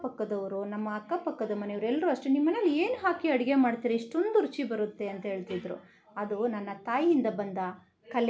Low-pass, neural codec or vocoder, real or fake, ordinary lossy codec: none; none; real; none